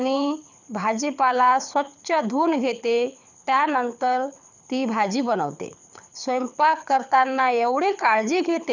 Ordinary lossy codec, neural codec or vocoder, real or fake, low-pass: none; codec, 24 kHz, 6 kbps, HILCodec; fake; 7.2 kHz